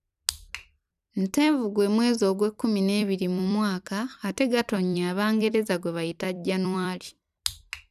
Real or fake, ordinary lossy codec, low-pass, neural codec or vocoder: fake; none; 14.4 kHz; vocoder, 44.1 kHz, 128 mel bands every 256 samples, BigVGAN v2